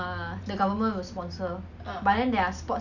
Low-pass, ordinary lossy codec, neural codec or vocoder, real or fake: 7.2 kHz; none; none; real